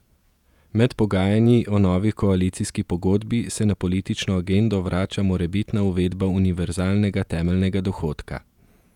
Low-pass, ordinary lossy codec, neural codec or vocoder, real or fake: 19.8 kHz; none; vocoder, 48 kHz, 128 mel bands, Vocos; fake